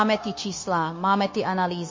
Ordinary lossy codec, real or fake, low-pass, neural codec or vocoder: MP3, 32 kbps; fake; 7.2 kHz; codec, 16 kHz, 0.9 kbps, LongCat-Audio-Codec